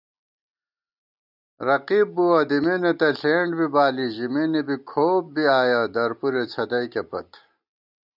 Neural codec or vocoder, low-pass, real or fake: none; 5.4 kHz; real